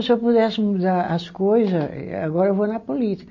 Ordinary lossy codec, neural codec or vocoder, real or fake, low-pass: MP3, 32 kbps; none; real; 7.2 kHz